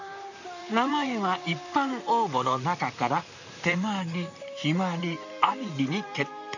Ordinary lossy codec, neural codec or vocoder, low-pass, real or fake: none; vocoder, 44.1 kHz, 128 mel bands, Pupu-Vocoder; 7.2 kHz; fake